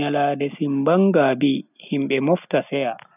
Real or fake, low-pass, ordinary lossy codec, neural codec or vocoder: real; 3.6 kHz; none; none